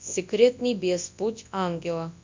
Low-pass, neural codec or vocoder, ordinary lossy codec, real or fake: 7.2 kHz; codec, 24 kHz, 0.9 kbps, WavTokenizer, large speech release; MP3, 64 kbps; fake